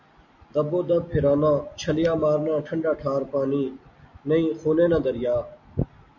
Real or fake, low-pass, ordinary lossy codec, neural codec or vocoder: real; 7.2 kHz; MP3, 64 kbps; none